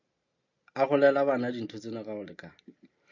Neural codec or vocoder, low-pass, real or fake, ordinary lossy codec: none; 7.2 kHz; real; AAC, 48 kbps